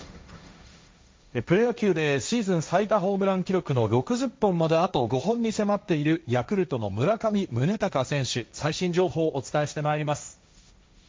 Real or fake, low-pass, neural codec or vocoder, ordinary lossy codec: fake; none; codec, 16 kHz, 1.1 kbps, Voila-Tokenizer; none